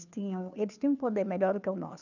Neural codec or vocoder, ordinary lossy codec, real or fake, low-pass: codec, 16 kHz, 2 kbps, FunCodec, trained on Chinese and English, 25 frames a second; none; fake; 7.2 kHz